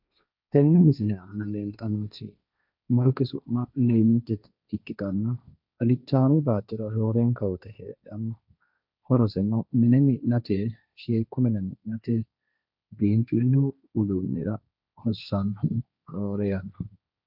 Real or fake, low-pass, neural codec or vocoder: fake; 5.4 kHz; codec, 16 kHz, 1.1 kbps, Voila-Tokenizer